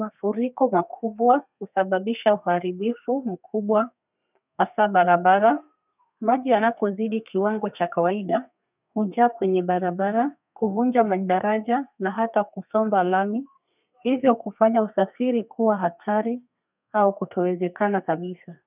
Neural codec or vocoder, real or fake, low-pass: codec, 32 kHz, 1.9 kbps, SNAC; fake; 3.6 kHz